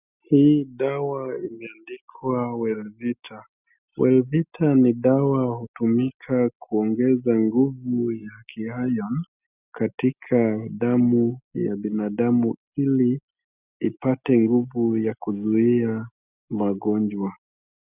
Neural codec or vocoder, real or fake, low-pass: none; real; 3.6 kHz